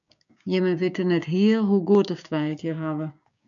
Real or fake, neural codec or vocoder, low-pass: fake; codec, 16 kHz, 6 kbps, DAC; 7.2 kHz